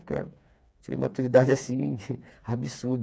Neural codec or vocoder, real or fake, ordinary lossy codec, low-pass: codec, 16 kHz, 4 kbps, FreqCodec, smaller model; fake; none; none